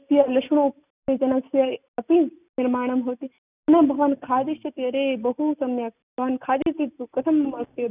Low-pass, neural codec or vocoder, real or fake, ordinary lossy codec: 3.6 kHz; none; real; none